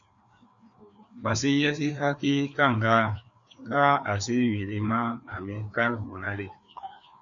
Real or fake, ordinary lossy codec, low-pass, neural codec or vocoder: fake; AAC, 64 kbps; 7.2 kHz; codec, 16 kHz, 2 kbps, FreqCodec, larger model